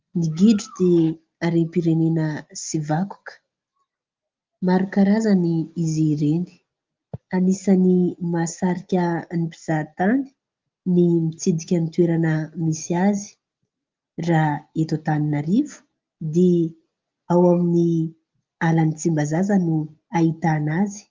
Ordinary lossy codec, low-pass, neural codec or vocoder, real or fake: Opus, 32 kbps; 7.2 kHz; none; real